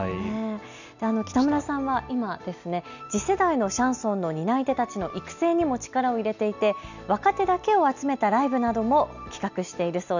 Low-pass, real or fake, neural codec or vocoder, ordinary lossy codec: 7.2 kHz; real; none; none